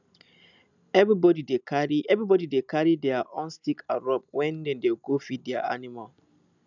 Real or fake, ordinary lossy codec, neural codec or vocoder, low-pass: real; none; none; 7.2 kHz